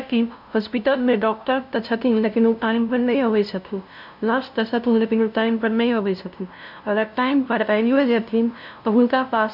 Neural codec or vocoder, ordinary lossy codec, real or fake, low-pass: codec, 16 kHz, 0.5 kbps, FunCodec, trained on LibriTTS, 25 frames a second; none; fake; 5.4 kHz